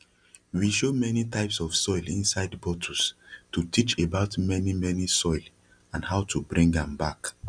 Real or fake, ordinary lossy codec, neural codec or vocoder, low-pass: real; none; none; 9.9 kHz